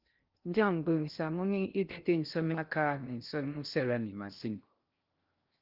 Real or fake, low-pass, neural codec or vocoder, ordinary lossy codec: fake; 5.4 kHz; codec, 16 kHz in and 24 kHz out, 0.6 kbps, FocalCodec, streaming, 2048 codes; Opus, 32 kbps